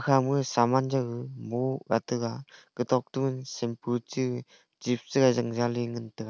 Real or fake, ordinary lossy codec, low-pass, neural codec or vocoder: real; none; none; none